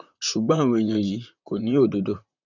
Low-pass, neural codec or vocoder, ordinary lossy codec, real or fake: 7.2 kHz; vocoder, 44.1 kHz, 128 mel bands, Pupu-Vocoder; none; fake